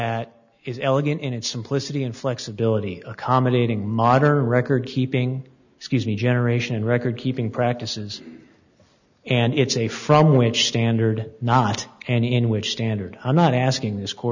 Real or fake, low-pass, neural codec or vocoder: real; 7.2 kHz; none